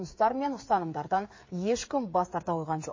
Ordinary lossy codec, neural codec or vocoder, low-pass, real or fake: MP3, 32 kbps; codec, 16 kHz, 8 kbps, FreqCodec, smaller model; 7.2 kHz; fake